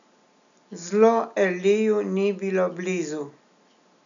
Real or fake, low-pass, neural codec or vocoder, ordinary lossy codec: real; 7.2 kHz; none; none